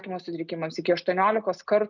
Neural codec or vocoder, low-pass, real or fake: none; 7.2 kHz; real